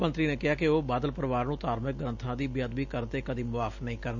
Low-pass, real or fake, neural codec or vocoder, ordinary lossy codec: 7.2 kHz; real; none; none